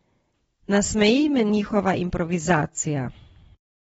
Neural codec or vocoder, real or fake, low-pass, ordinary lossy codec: none; real; 10.8 kHz; AAC, 24 kbps